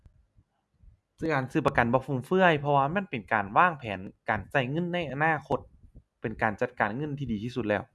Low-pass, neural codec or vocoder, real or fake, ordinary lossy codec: none; none; real; none